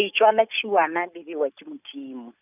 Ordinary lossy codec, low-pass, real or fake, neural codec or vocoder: none; 3.6 kHz; real; none